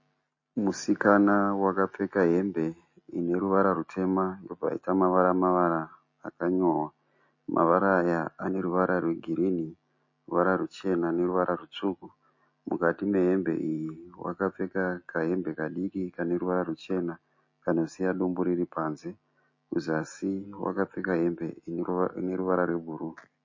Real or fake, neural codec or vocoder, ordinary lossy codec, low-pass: real; none; MP3, 32 kbps; 7.2 kHz